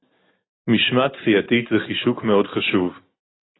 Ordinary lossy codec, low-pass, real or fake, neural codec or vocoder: AAC, 16 kbps; 7.2 kHz; real; none